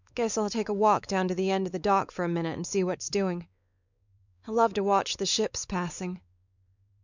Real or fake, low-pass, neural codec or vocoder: fake; 7.2 kHz; codec, 16 kHz, 4 kbps, X-Codec, WavLM features, trained on Multilingual LibriSpeech